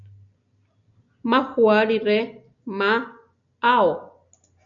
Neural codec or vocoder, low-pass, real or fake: none; 7.2 kHz; real